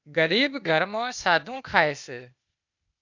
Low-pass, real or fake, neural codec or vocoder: 7.2 kHz; fake; codec, 16 kHz, 0.8 kbps, ZipCodec